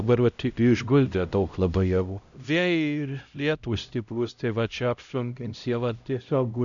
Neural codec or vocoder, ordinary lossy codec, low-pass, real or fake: codec, 16 kHz, 0.5 kbps, X-Codec, HuBERT features, trained on LibriSpeech; MP3, 96 kbps; 7.2 kHz; fake